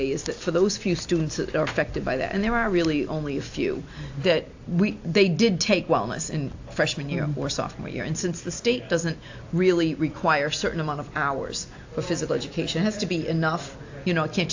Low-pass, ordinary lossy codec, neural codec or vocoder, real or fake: 7.2 kHz; AAC, 48 kbps; none; real